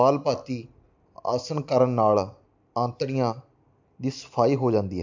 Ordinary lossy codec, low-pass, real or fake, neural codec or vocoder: MP3, 64 kbps; 7.2 kHz; real; none